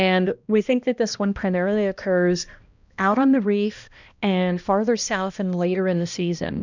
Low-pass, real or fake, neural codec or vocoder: 7.2 kHz; fake; codec, 16 kHz, 1 kbps, X-Codec, HuBERT features, trained on balanced general audio